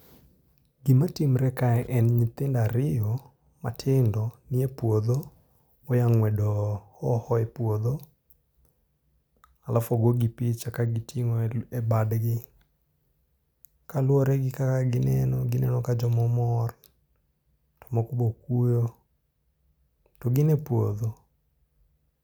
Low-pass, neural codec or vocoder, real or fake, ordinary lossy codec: none; none; real; none